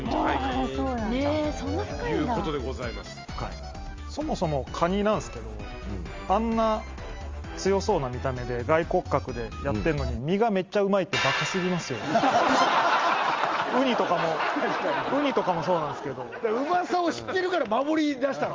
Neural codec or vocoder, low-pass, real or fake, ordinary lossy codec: none; 7.2 kHz; real; Opus, 32 kbps